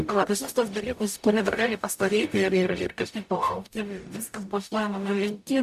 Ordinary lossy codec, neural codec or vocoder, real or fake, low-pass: AAC, 96 kbps; codec, 44.1 kHz, 0.9 kbps, DAC; fake; 14.4 kHz